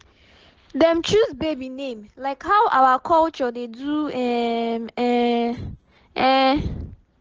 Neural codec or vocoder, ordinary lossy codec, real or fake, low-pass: none; Opus, 16 kbps; real; 7.2 kHz